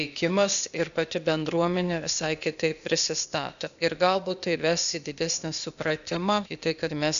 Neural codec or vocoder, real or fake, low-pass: codec, 16 kHz, 0.8 kbps, ZipCodec; fake; 7.2 kHz